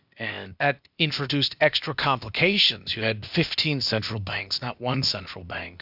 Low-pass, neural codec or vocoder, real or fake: 5.4 kHz; codec, 16 kHz, 0.8 kbps, ZipCodec; fake